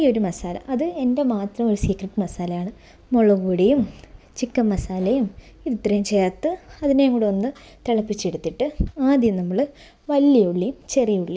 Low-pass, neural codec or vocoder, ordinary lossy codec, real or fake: none; none; none; real